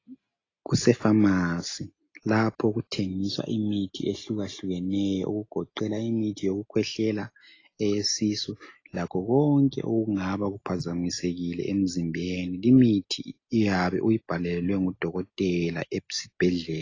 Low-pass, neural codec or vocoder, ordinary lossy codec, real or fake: 7.2 kHz; none; AAC, 32 kbps; real